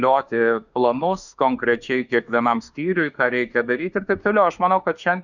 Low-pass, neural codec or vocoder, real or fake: 7.2 kHz; autoencoder, 48 kHz, 32 numbers a frame, DAC-VAE, trained on Japanese speech; fake